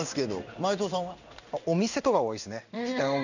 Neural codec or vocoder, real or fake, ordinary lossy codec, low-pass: codec, 16 kHz in and 24 kHz out, 1 kbps, XY-Tokenizer; fake; none; 7.2 kHz